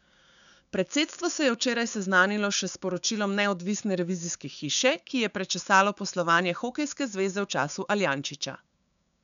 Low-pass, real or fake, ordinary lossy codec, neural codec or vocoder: 7.2 kHz; fake; MP3, 96 kbps; codec, 16 kHz, 6 kbps, DAC